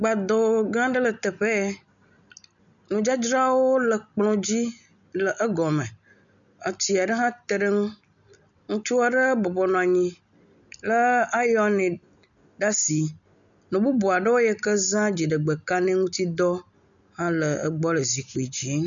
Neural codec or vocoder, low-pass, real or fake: none; 7.2 kHz; real